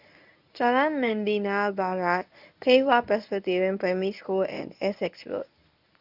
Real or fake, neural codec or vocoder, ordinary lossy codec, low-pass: fake; codec, 24 kHz, 0.9 kbps, WavTokenizer, medium speech release version 1; none; 5.4 kHz